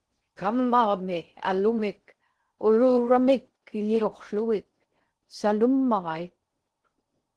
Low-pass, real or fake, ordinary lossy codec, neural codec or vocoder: 10.8 kHz; fake; Opus, 16 kbps; codec, 16 kHz in and 24 kHz out, 0.6 kbps, FocalCodec, streaming, 4096 codes